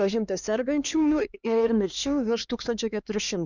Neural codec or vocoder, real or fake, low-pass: codec, 16 kHz, 2 kbps, X-Codec, HuBERT features, trained on LibriSpeech; fake; 7.2 kHz